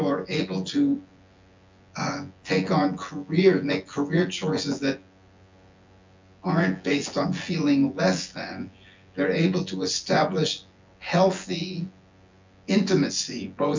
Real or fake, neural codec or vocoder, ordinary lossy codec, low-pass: fake; vocoder, 24 kHz, 100 mel bands, Vocos; MP3, 64 kbps; 7.2 kHz